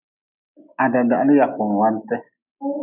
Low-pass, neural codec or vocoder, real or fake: 3.6 kHz; none; real